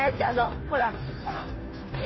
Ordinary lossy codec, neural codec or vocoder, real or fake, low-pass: MP3, 24 kbps; codec, 16 kHz, 0.5 kbps, FunCodec, trained on Chinese and English, 25 frames a second; fake; 7.2 kHz